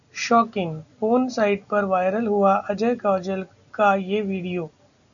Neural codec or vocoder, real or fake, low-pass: none; real; 7.2 kHz